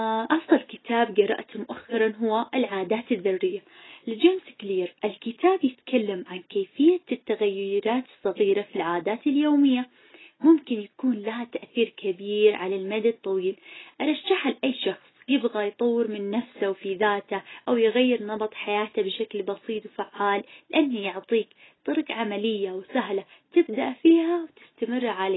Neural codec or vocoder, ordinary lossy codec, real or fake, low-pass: none; AAC, 16 kbps; real; 7.2 kHz